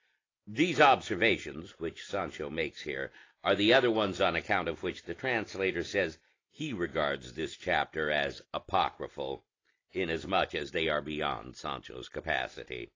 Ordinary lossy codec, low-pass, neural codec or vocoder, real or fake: AAC, 32 kbps; 7.2 kHz; none; real